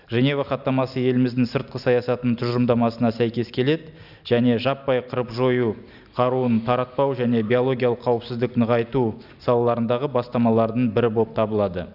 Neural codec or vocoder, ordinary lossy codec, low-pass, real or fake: none; none; 5.4 kHz; real